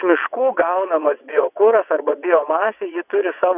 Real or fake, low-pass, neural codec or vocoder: fake; 3.6 kHz; vocoder, 22.05 kHz, 80 mel bands, WaveNeXt